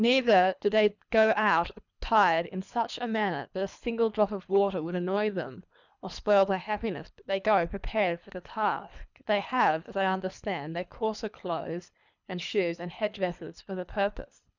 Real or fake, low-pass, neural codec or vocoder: fake; 7.2 kHz; codec, 24 kHz, 3 kbps, HILCodec